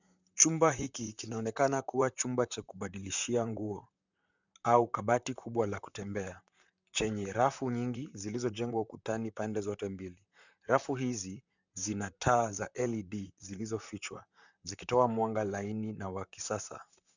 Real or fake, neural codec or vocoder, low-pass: fake; vocoder, 44.1 kHz, 128 mel bands, Pupu-Vocoder; 7.2 kHz